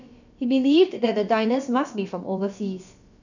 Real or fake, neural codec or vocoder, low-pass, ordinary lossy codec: fake; codec, 16 kHz, about 1 kbps, DyCAST, with the encoder's durations; 7.2 kHz; none